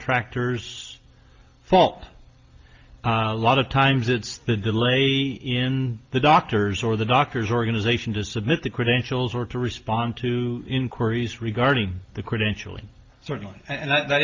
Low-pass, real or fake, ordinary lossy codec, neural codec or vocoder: 7.2 kHz; real; Opus, 24 kbps; none